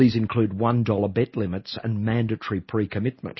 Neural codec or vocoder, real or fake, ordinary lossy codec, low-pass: none; real; MP3, 24 kbps; 7.2 kHz